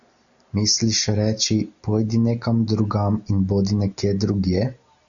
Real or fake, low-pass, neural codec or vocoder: real; 7.2 kHz; none